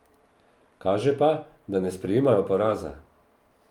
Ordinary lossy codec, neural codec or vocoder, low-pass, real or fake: Opus, 32 kbps; vocoder, 44.1 kHz, 128 mel bands every 512 samples, BigVGAN v2; 19.8 kHz; fake